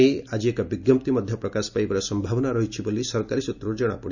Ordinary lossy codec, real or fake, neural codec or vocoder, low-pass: none; real; none; 7.2 kHz